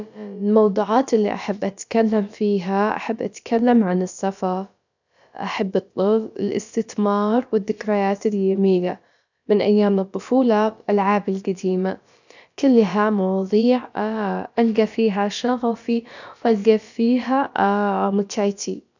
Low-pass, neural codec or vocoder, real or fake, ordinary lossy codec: 7.2 kHz; codec, 16 kHz, about 1 kbps, DyCAST, with the encoder's durations; fake; none